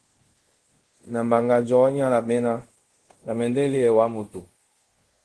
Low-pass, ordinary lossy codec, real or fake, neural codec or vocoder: 10.8 kHz; Opus, 16 kbps; fake; codec, 24 kHz, 0.5 kbps, DualCodec